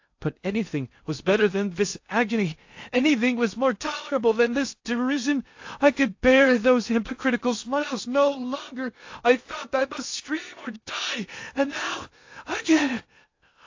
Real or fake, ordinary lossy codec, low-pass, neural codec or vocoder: fake; AAC, 48 kbps; 7.2 kHz; codec, 16 kHz in and 24 kHz out, 0.6 kbps, FocalCodec, streaming, 2048 codes